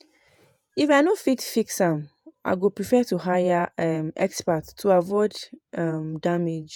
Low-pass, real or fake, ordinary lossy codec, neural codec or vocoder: none; fake; none; vocoder, 48 kHz, 128 mel bands, Vocos